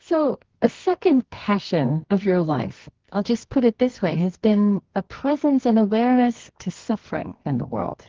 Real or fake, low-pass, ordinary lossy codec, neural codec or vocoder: fake; 7.2 kHz; Opus, 16 kbps; codec, 24 kHz, 0.9 kbps, WavTokenizer, medium music audio release